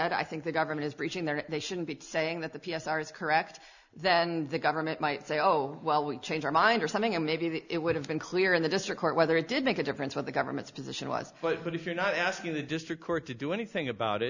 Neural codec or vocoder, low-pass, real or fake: none; 7.2 kHz; real